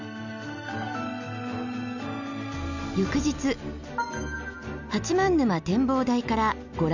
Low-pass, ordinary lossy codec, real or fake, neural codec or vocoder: 7.2 kHz; none; real; none